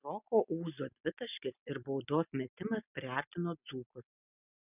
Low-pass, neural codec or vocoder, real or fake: 3.6 kHz; none; real